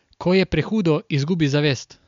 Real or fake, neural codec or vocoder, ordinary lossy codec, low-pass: real; none; MP3, 64 kbps; 7.2 kHz